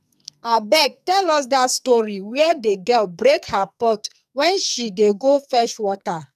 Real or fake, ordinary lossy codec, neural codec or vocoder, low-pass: fake; none; codec, 44.1 kHz, 2.6 kbps, SNAC; 14.4 kHz